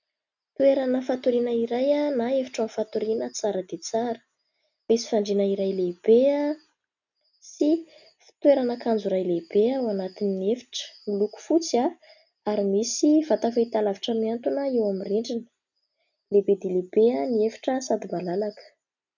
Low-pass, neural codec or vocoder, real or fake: 7.2 kHz; none; real